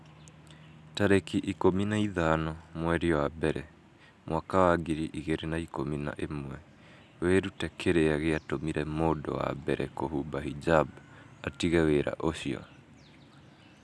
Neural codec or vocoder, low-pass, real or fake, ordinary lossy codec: none; none; real; none